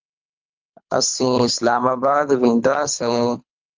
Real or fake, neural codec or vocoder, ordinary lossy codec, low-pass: fake; codec, 24 kHz, 3 kbps, HILCodec; Opus, 24 kbps; 7.2 kHz